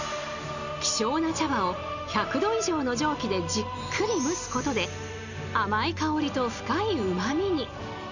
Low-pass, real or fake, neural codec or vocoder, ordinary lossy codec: 7.2 kHz; real; none; none